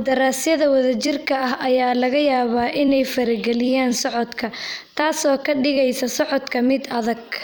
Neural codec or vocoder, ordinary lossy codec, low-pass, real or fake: vocoder, 44.1 kHz, 128 mel bands every 256 samples, BigVGAN v2; none; none; fake